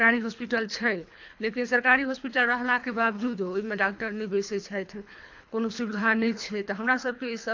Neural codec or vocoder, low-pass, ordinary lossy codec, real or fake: codec, 24 kHz, 3 kbps, HILCodec; 7.2 kHz; AAC, 48 kbps; fake